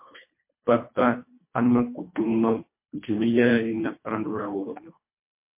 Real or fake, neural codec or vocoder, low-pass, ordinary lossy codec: fake; codec, 24 kHz, 1.5 kbps, HILCodec; 3.6 kHz; MP3, 24 kbps